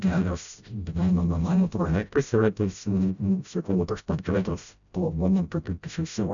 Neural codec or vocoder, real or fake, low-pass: codec, 16 kHz, 0.5 kbps, FreqCodec, smaller model; fake; 7.2 kHz